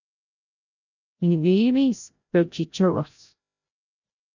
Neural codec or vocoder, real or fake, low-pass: codec, 16 kHz, 0.5 kbps, FreqCodec, larger model; fake; 7.2 kHz